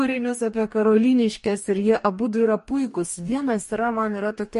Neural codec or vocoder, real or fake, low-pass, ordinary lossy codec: codec, 44.1 kHz, 2.6 kbps, DAC; fake; 14.4 kHz; MP3, 48 kbps